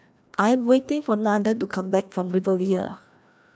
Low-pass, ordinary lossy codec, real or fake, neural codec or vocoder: none; none; fake; codec, 16 kHz, 1 kbps, FreqCodec, larger model